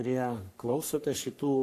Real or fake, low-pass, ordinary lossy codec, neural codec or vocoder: fake; 14.4 kHz; AAC, 64 kbps; codec, 44.1 kHz, 3.4 kbps, Pupu-Codec